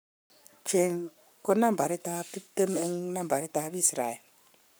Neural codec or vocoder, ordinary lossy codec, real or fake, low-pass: codec, 44.1 kHz, 7.8 kbps, Pupu-Codec; none; fake; none